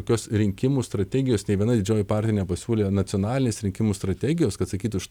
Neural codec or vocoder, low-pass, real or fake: none; 19.8 kHz; real